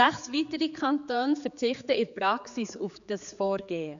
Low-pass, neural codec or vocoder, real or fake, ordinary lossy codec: 7.2 kHz; codec, 16 kHz, 4 kbps, X-Codec, HuBERT features, trained on general audio; fake; none